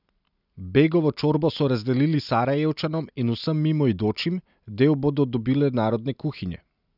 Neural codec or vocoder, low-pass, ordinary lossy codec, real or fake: none; 5.4 kHz; none; real